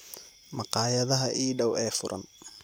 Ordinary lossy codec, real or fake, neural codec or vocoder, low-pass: none; real; none; none